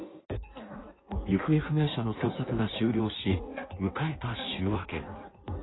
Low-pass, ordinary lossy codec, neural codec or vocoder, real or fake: 7.2 kHz; AAC, 16 kbps; codec, 16 kHz in and 24 kHz out, 1.1 kbps, FireRedTTS-2 codec; fake